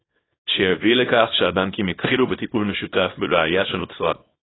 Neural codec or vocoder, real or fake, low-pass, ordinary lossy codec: codec, 24 kHz, 0.9 kbps, WavTokenizer, small release; fake; 7.2 kHz; AAC, 16 kbps